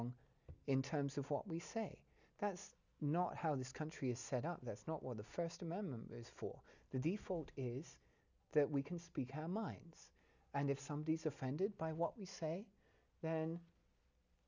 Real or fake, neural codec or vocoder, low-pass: real; none; 7.2 kHz